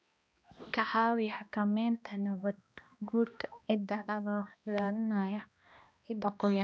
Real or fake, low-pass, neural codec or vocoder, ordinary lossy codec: fake; none; codec, 16 kHz, 1 kbps, X-Codec, HuBERT features, trained on balanced general audio; none